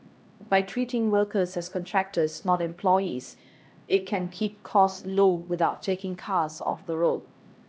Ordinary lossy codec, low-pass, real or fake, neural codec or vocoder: none; none; fake; codec, 16 kHz, 1 kbps, X-Codec, HuBERT features, trained on LibriSpeech